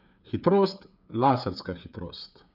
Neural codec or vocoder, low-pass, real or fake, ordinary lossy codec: codec, 16 kHz, 8 kbps, FreqCodec, smaller model; 5.4 kHz; fake; none